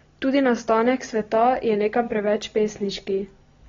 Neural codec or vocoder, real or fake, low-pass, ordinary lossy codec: none; real; 7.2 kHz; AAC, 24 kbps